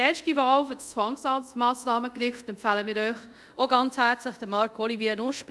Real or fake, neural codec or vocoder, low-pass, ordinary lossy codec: fake; codec, 24 kHz, 0.5 kbps, DualCodec; none; none